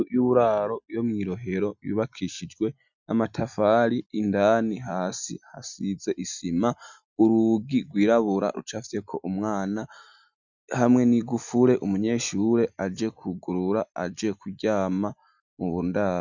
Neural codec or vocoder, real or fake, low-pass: none; real; 7.2 kHz